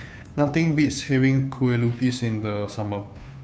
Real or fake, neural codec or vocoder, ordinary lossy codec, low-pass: fake; codec, 16 kHz, 2 kbps, FunCodec, trained on Chinese and English, 25 frames a second; none; none